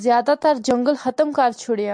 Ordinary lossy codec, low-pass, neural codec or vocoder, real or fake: MP3, 64 kbps; 9.9 kHz; none; real